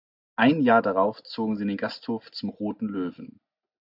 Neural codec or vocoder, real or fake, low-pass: none; real; 5.4 kHz